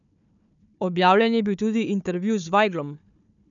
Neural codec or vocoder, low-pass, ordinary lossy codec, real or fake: codec, 16 kHz, 4 kbps, FunCodec, trained on Chinese and English, 50 frames a second; 7.2 kHz; none; fake